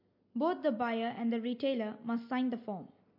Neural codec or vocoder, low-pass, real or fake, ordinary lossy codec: none; 5.4 kHz; real; MP3, 32 kbps